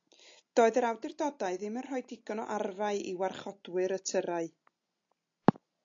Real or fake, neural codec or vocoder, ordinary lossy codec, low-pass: real; none; AAC, 48 kbps; 7.2 kHz